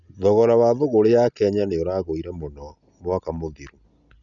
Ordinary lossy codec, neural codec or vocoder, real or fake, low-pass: none; none; real; 7.2 kHz